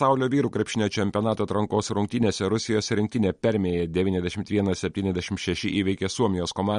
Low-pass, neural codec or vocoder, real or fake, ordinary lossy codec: 19.8 kHz; none; real; MP3, 48 kbps